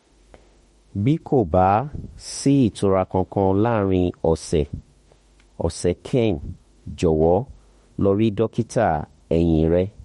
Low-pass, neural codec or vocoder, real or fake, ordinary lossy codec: 19.8 kHz; autoencoder, 48 kHz, 32 numbers a frame, DAC-VAE, trained on Japanese speech; fake; MP3, 48 kbps